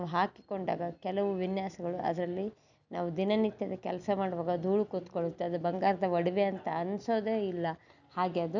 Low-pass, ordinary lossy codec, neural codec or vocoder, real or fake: 7.2 kHz; none; none; real